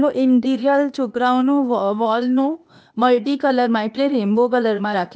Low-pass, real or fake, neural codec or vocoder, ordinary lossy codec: none; fake; codec, 16 kHz, 0.8 kbps, ZipCodec; none